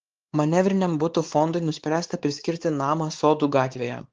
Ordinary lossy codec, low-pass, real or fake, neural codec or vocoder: Opus, 16 kbps; 7.2 kHz; fake; codec, 16 kHz, 4 kbps, X-Codec, WavLM features, trained on Multilingual LibriSpeech